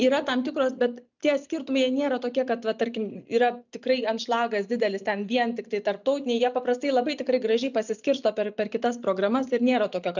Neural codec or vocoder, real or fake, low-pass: none; real; 7.2 kHz